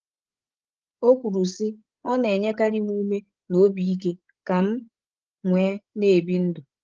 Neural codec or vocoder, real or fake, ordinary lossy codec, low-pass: codec, 16 kHz, 8 kbps, FreqCodec, larger model; fake; Opus, 16 kbps; 7.2 kHz